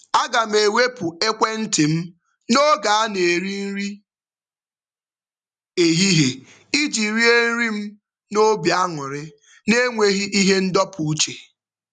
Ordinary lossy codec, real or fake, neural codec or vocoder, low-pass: none; real; none; 9.9 kHz